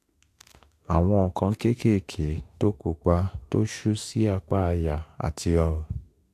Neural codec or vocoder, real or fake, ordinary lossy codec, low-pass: autoencoder, 48 kHz, 32 numbers a frame, DAC-VAE, trained on Japanese speech; fake; AAC, 64 kbps; 14.4 kHz